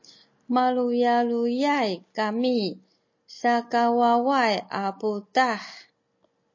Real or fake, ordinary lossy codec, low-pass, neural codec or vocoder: real; MP3, 32 kbps; 7.2 kHz; none